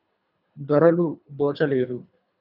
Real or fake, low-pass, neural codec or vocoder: fake; 5.4 kHz; codec, 24 kHz, 3 kbps, HILCodec